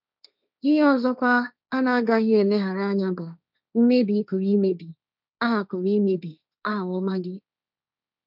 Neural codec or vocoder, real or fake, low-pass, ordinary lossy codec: codec, 16 kHz, 1.1 kbps, Voila-Tokenizer; fake; 5.4 kHz; none